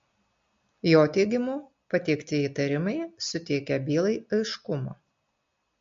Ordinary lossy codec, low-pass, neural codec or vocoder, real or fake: MP3, 48 kbps; 7.2 kHz; none; real